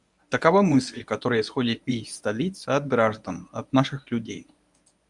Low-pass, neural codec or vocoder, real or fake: 10.8 kHz; codec, 24 kHz, 0.9 kbps, WavTokenizer, medium speech release version 1; fake